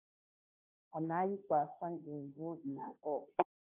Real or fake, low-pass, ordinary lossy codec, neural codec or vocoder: fake; 3.6 kHz; AAC, 32 kbps; codec, 16 kHz, 0.5 kbps, FunCodec, trained on Chinese and English, 25 frames a second